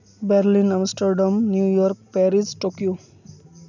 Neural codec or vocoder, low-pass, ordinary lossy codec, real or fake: none; 7.2 kHz; none; real